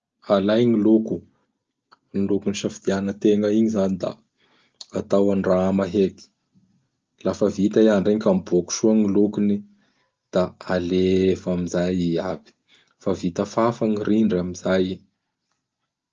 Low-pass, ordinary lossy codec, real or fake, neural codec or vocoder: 7.2 kHz; Opus, 24 kbps; real; none